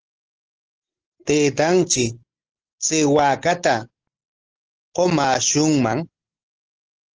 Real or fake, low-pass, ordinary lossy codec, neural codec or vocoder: real; 7.2 kHz; Opus, 16 kbps; none